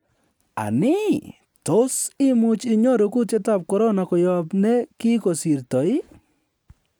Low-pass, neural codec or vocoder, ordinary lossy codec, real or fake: none; none; none; real